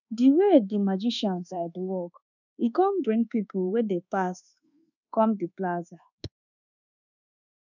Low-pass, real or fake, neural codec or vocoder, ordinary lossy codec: 7.2 kHz; fake; codec, 24 kHz, 1.2 kbps, DualCodec; none